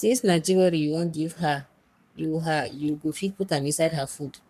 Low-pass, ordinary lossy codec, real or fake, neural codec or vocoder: 14.4 kHz; none; fake; codec, 44.1 kHz, 3.4 kbps, Pupu-Codec